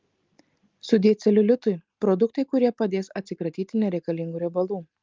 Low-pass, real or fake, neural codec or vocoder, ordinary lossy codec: 7.2 kHz; real; none; Opus, 32 kbps